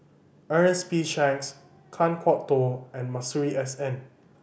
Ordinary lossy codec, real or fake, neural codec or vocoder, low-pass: none; real; none; none